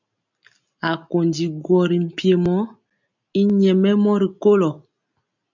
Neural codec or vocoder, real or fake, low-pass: none; real; 7.2 kHz